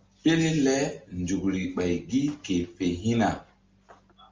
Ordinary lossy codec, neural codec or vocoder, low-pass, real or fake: Opus, 32 kbps; none; 7.2 kHz; real